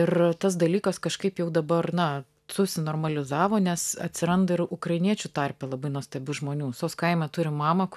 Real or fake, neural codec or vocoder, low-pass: real; none; 14.4 kHz